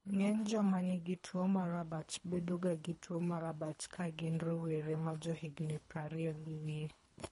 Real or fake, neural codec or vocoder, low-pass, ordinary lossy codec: fake; codec, 24 kHz, 3 kbps, HILCodec; 10.8 kHz; MP3, 48 kbps